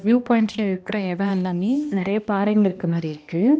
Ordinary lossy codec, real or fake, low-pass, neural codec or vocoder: none; fake; none; codec, 16 kHz, 1 kbps, X-Codec, HuBERT features, trained on balanced general audio